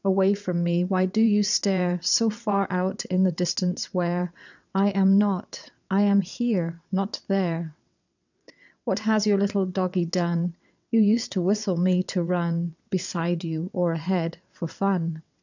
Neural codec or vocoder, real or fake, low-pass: vocoder, 22.05 kHz, 80 mel bands, WaveNeXt; fake; 7.2 kHz